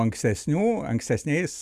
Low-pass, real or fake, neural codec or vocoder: 14.4 kHz; real; none